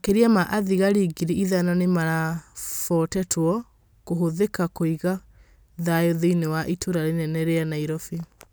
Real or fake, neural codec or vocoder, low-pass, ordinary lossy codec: real; none; none; none